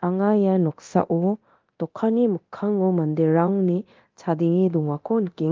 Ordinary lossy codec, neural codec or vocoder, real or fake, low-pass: Opus, 32 kbps; codec, 24 kHz, 0.9 kbps, DualCodec; fake; 7.2 kHz